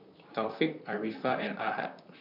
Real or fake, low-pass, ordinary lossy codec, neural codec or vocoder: fake; 5.4 kHz; none; vocoder, 44.1 kHz, 128 mel bands, Pupu-Vocoder